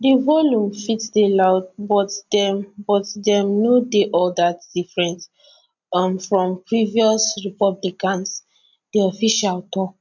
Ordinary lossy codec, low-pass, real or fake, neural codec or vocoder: none; 7.2 kHz; real; none